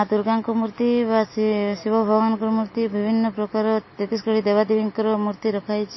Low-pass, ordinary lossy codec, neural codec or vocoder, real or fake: 7.2 kHz; MP3, 24 kbps; none; real